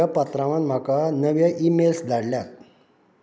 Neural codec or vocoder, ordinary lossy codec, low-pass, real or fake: none; none; none; real